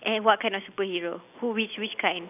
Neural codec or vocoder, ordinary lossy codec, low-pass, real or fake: none; none; 3.6 kHz; real